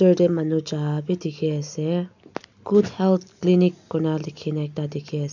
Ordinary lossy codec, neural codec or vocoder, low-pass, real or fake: none; none; 7.2 kHz; real